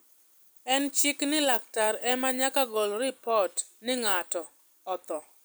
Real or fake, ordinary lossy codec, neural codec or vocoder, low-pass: fake; none; vocoder, 44.1 kHz, 128 mel bands every 256 samples, BigVGAN v2; none